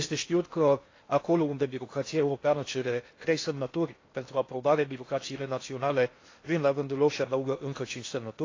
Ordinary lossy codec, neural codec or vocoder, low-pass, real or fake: AAC, 32 kbps; codec, 16 kHz in and 24 kHz out, 0.8 kbps, FocalCodec, streaming, 65536 codes; 7.2 kHz; fake